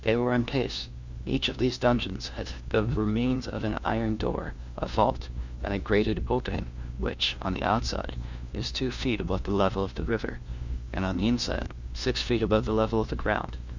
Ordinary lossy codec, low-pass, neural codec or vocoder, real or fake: Opus, 64 kbps; 7.2 kHz; codec, 16 kHz, 1 kbps, FunCodec, trained on LibriTTS, 50 frames a second; fake